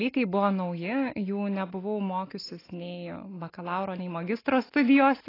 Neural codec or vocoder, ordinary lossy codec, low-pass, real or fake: none; AAC, 24 kbps; 5.4 kHz; real